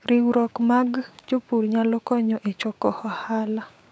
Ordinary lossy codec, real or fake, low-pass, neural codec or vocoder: none; fake; none; codec, 16 kHz, 6 kbps, DAC